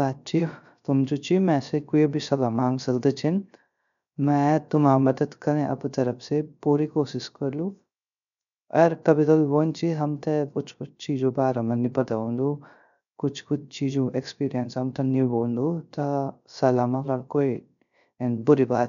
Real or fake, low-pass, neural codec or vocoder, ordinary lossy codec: fake; 7.2 kHz; codec, 16 kHz, 0.3 kbps, FocalCodec; none